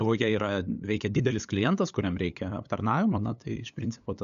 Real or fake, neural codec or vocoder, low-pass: fake; codec, 16 kHz, 8 kbps, FunCodec, trained on LibriTTS, 25 frames a second; 7.2 kHz